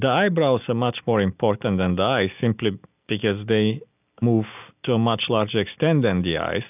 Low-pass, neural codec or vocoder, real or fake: 3.6 kHz; none; real